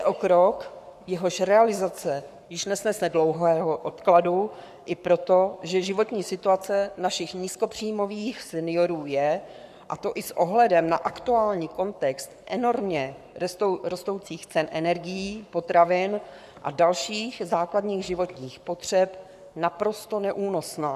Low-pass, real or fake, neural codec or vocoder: 14.4 kHz; fake; codec, 44.1 kHz, 7.8 kbps, Pupu-Codec